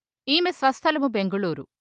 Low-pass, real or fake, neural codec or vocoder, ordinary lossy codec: 10.8 kHz; real; none; Opus, 24 kbps